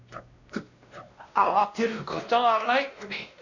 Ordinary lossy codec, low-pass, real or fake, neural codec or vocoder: none; 7.2 kHz; fake; codec, 16 kHz, 1 kbps, X-Codec, WavLM features, trained on Multilingual LibriSpeech